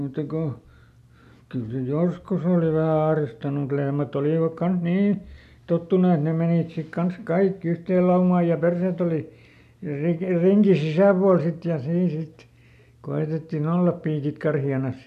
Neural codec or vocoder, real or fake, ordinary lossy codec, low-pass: none; real; none; 14.4 kHz